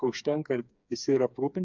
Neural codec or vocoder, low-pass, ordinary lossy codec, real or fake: codec, 16 kHz, 4 kbps, FreqCodec, smaller model; 7.2 kHz; MP3, 64 kbps; fake